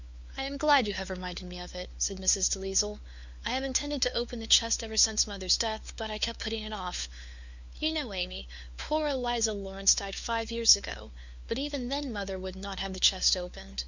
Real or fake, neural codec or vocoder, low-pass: fake; codec, 16 kHz, 4 kbps, FunCodec, trained on LibriTTS, 50 frames a second; 7.2 kHz